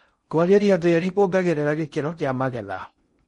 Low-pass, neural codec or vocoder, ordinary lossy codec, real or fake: 10.8 kHz; codec, 16 kHz in and 24 kHz out, 0.6 kbps, FocalCodec, streaming, 4096 codes; MP3, 48 kbps; fake